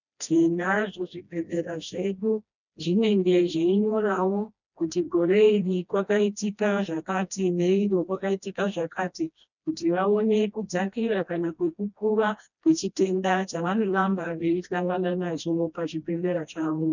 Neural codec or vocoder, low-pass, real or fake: codec, 16 kHz, 1 kbps, FreqCodec, smaller model; 7.2 kHz; fake